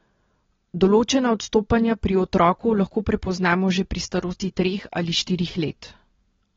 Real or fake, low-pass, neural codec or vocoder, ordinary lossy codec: real; 7.2 kHz; none; AAC, 24 kbps